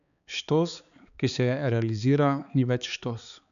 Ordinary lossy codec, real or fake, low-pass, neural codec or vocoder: none; fake; 7.2 kHz; codec, 16 kHz, 4 kbps, X-Codec, HuBERT features, trained on balanced general audio